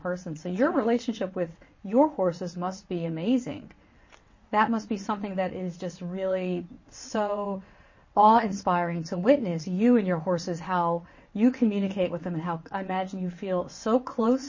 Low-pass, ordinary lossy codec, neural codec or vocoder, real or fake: 7.2 kHz; MP3, 32 kbps; vocoder, 22.05 kHz, 80 mel bands, WaveNeXt; fake